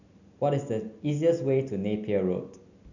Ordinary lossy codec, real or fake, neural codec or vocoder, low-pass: none; real; none; 7.2 kHz